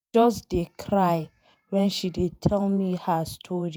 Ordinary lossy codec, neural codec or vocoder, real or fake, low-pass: none; vocoder, 48 kHz, 128 mel bands, Vocos; fake; none